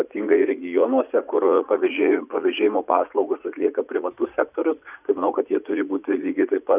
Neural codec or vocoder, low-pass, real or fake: vocoder, 44.1 kHz, 80 mel bands, Vocos; 3.6 kHz; fake